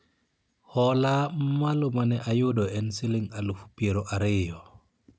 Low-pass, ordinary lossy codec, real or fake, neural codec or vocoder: none; none; real; none